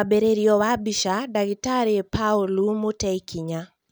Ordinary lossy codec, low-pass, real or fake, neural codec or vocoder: none; none; real; none